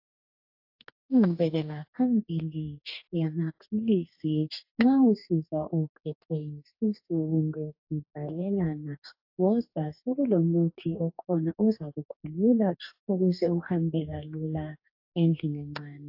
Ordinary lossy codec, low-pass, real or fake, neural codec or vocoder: AAC, 48 kbps; 5.4 kHz; fake; codec, 44.1 kHz, 2.6 kbps, DAC